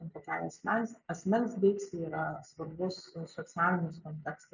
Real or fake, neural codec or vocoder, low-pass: real; none; 7.2 kHz